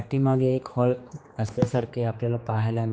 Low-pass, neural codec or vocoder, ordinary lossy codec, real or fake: none; codec, 16 kHz, 2 kbps, X-Codec, HuBERT features, trained on general audio; none; fake